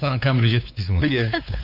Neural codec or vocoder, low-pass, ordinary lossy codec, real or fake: codec, 16 kHz, 4 kbps, X-Codec, WavLM features, trained on Multilingual LibriSpeech; 5.4 kHz; none; fake